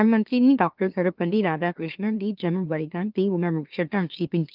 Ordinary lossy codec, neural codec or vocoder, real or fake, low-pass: Opus, 24 kbps; autoencoder, 44.1 kHz, a latent of 192 numbers a frame, MeloTTS; fake; 5.4 kHz